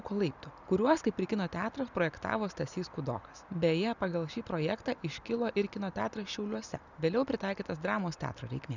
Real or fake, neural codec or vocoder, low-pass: real; none; 7.2 kHz